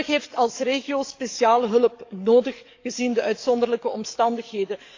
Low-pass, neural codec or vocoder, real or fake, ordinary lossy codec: 7.2 kHz; codec, 44.1 kHz, 7.8 kbps, DAC; fake; AAC, 48 kbps